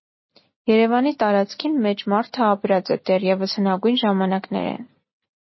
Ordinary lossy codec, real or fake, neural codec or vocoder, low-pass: MP3, 24 kbps; real; none; 7.2 kHz